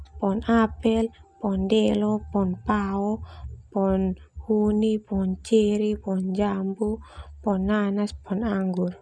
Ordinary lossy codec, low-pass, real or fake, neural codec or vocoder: none; none; real; none